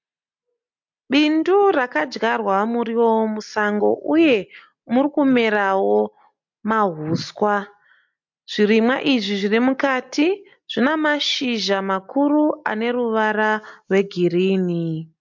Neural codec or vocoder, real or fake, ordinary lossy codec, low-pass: none; real; MP3, 48 kbps; 7.2 kHz